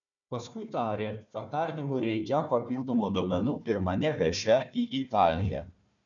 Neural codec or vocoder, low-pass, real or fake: codec, 16 kHz, 1 kbps, FunCodec, trained on Chinese and English, 50 frames a second; 7.2 kHz; fake